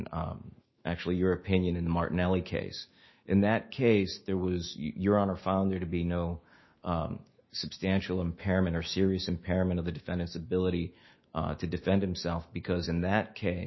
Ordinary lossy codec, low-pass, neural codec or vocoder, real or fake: MP3, 24 kbps; 7.2 kHz; autoencoder, 48 kHz, 128 numbers a frame, DAC-VAE, trained on Japanese speech; fake